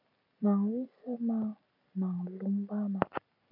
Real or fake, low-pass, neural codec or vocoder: fake; 5.4 kHz; vocoder, 44.1 kHz, 128 mel bands every 256 samples, BigVGAN v2